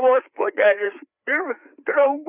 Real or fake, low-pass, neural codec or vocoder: fake; 3.6 kHz; codec, 16 kHz, 4 kbps, FreqCodec, larger model